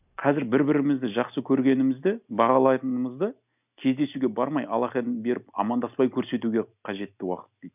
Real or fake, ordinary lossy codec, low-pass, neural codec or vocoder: real; none; 3.6 kHz; none